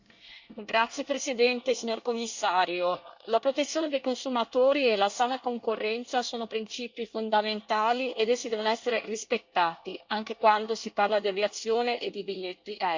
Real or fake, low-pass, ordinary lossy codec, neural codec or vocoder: fake; 7.2 kHz; Opus, 64 kbps; codec, 24 kHz, 1 kbps, SNAC